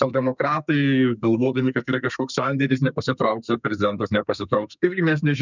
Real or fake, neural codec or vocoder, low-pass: fake; codec, 32 kHz, 1.9 kbps, SNAC; 7.2 kHz